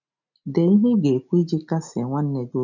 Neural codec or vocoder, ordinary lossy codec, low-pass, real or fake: none; none; 7.2 kHz; real